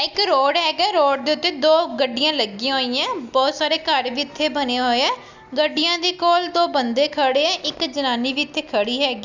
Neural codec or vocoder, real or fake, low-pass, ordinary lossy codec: none; real; 7.2 kHz; none